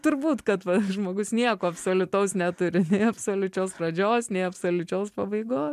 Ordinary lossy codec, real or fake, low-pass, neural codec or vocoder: MP3, 96 kbps; real; 14.4 kHz; none